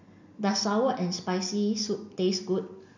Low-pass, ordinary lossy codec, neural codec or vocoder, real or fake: 7.2 kHz; none; none; real